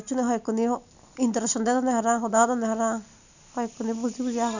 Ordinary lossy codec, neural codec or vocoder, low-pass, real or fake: none; none; 7.2 kHz; real